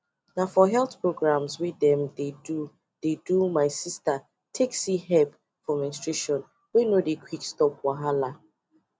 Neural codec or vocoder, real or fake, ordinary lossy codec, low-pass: none; real; none; none